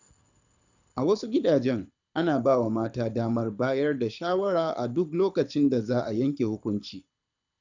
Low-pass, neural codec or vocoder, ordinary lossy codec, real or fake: 7.2 kHz; codec, 24 kHz, 6 kbps, HILCodec; none; fake